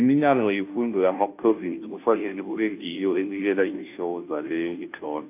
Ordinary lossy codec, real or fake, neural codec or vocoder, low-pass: AAC, 32 kbps; fake; codec, 16 kHz, 0.5 kbps, FunCodec, trained on Chinese and English, 25 frames a second; 3.6 kHz